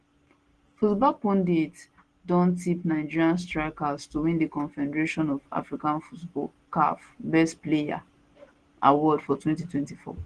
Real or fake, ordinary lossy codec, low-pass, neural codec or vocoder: real; Opus, 16 kbps; 9.9 kHz; none